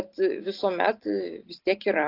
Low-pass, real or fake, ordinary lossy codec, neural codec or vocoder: 5.4 kHz; real; AAC, 32 kbps; none